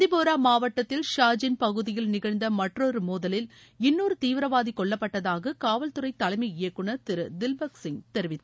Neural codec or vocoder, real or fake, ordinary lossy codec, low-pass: none; real; none; none